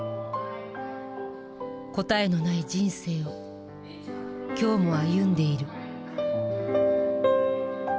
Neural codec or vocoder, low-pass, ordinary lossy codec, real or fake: none; none; none; real